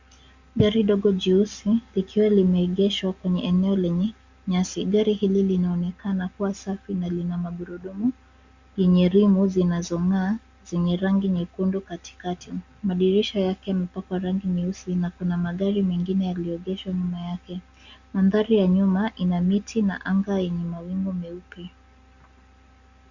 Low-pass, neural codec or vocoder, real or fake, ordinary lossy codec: 7.2 kHz; none; real; Opus, 64 kbps